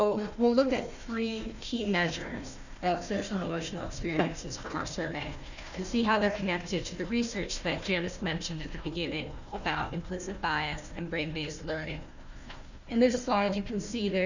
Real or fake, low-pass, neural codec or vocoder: fake; 7.2 kHz; codec, 16 kHz, 1 kbps, FunCodec, trained on Chinese and English, 50 frames a second